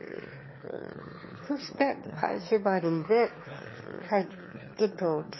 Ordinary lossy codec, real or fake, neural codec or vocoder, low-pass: MP3, 24 kbps; fake; autoencoder, 22.05 kHz, a latent of 192 numbers a frame, VITS, trained on one speaker; 7.2 kHz